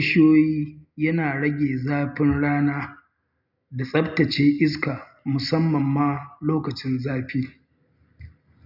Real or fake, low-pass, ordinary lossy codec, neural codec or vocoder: real; 5.4 kHz; none; none